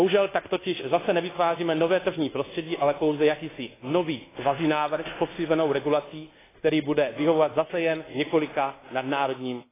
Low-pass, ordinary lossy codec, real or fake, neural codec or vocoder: 3.6 kHz; AAC, 16 kbps; fake; codec, 24 kHz, 1.2 kbps, DualCodec